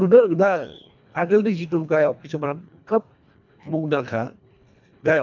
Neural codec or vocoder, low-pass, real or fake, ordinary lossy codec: codec, 24 kHz, 1.5 kbps, HILCodec; 7.2 kHz; fake; none